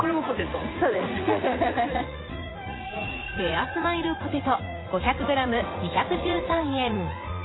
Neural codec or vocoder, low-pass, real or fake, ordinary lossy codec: vocoder, 44.1 kHz, 80 mel bands, Vocos; 7.2 kHz; fake; AAC, 16 kbps